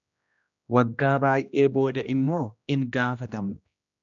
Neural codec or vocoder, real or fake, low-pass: codec, 16 kHz, 1 kbps, X-Codec, HuBERT features, trained on general audio; fake; 7.2 kHz